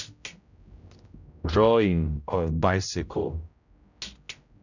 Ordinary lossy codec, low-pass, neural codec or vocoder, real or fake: none; 7.2 kHz; codec, 16 kHz, 0.5 kbps, X-Codec, HuBERT features, trained on general audio; fake